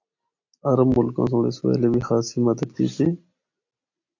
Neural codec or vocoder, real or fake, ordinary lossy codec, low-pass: none; real; AAC, 48 kbps; 7.2 kHz